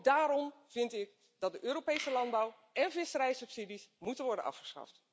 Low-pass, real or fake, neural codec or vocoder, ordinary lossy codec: none; real; none; none